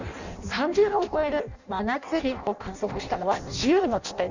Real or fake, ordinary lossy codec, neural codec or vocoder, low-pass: fake; none; codec, 16 kHz in and 24 kHz out, 0.6 kbps, FireRedTTS-2 codec; 7.2 kHz